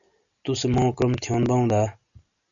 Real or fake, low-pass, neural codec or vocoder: real; 7.2 kHz; none